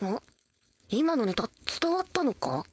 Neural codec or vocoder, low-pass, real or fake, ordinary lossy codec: codec, 16 kHz, 4.8 kbps, FACodec; none; fake; none